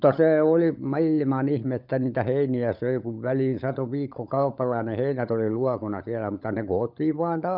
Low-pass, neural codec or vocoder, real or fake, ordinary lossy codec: 5.4 kHz; codec, 16 kHz, 16 kbps, FunCodec, trained on LibriTTS, 50 frames a second; fake; none